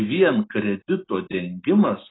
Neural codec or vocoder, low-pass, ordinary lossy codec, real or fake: none; 7.2 kHz; AAC, 16 kbps; real